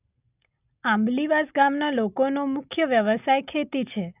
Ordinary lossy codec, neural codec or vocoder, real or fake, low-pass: none; none; real; 3.6 kHz